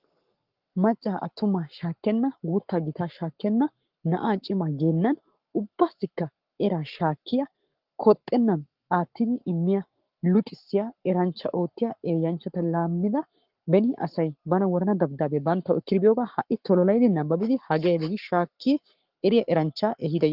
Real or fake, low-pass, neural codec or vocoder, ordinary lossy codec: fake; 5.4 kHz; codec, 44.1 kHz, 7.8 kbps, Pupu-Codec; Opus, 32 kbps